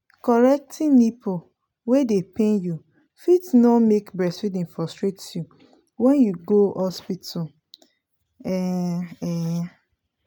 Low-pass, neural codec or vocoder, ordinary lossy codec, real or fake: none; none; none; real